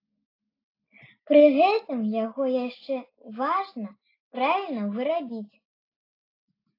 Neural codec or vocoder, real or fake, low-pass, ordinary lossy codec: none; real; 5.4 kHz; AAC, 32 kbps